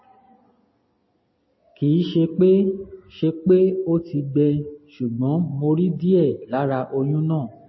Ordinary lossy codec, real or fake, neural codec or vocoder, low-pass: MP3, 24 kbps; real; none; 7.2 kHz